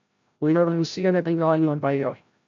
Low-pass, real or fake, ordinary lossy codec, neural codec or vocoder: 7.2 kHz; fake; MP3, 64 kbps; codec, 16 kHz, 0.5 kbps, FreqCodec, larger model